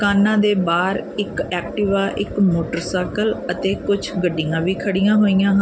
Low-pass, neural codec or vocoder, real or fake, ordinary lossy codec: none; none; real; none